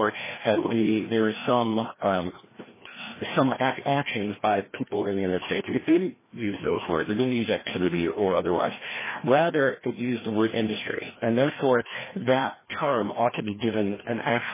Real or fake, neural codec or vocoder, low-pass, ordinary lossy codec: fake; codec, 16 kHz, 1 kbps, FreqCodec, larger model; 3.6 kHz; MP3, 16 kbps